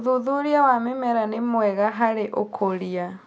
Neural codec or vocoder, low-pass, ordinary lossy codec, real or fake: none; none; none; real